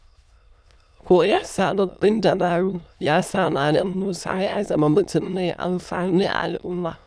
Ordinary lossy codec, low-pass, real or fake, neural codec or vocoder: none; none; fake; autoencoder, 22.05 kHz, a latent of 192 numbers a frame, VITS, trained on many speakers